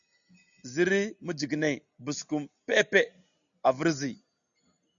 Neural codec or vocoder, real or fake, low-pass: none; real; 7.2 kHz